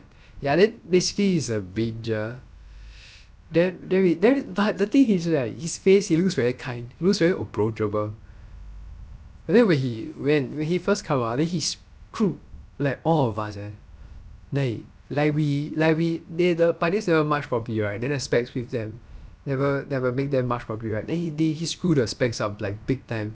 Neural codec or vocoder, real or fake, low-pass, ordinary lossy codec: codec, 16 kHz, about 1 kbps, DyCAST, with the encoder's durations; fake; none; none